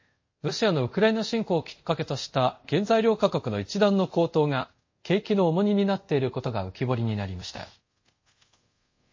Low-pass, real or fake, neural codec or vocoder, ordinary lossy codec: 7.2 kHz; fake; codec, 24 kHz, 0.5 kbps, DualCodec; MP3, 32 kbps